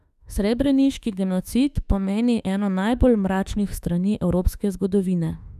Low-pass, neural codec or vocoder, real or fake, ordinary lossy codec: 14.4 kHz; autoencoder, 48 kHz, 32 numbers a frame, DAC-VAE, trained on Japanese speech; fake; none